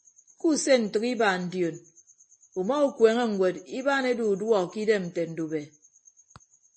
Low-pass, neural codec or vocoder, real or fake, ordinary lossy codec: 9.9 kHz; none; real; MP3, 32 kbps